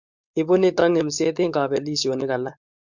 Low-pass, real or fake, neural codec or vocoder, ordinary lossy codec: 7.2 kHz; fake; codec, 16 kHz, 4.8 kbps, FACodec; MP3, 64 kbps